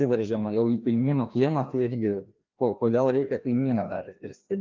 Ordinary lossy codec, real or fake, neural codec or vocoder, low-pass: Opus, 24 kbps; fake; codec, 16 kHz, 1 kbps, FreqCodec, larger model; 7.2 kHz